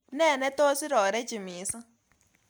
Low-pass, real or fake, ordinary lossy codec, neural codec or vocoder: none; real; none; none